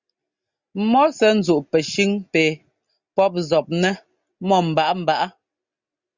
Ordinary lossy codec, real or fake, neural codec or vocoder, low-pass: Opus, 64 kbps; real; none; 7.2 kHz